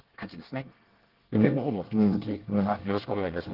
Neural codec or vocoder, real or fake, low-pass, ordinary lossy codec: codec, 24 kHz, 1 kbps, SNAC; fake; 5.4 kHz; Opus, 16 kbps